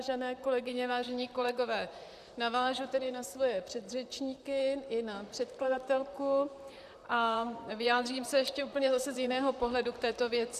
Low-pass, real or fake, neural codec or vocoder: 14.4 kHz; fake; vocoder, 44.1 kHz, 128 mel bands, Pupu-Vocoder